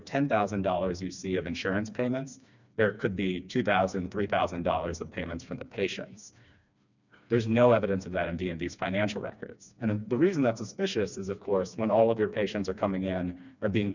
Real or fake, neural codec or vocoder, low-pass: fake; codec, 16 kHz, 2 kbps, FreqCodec, smaller model; 7.2 kHz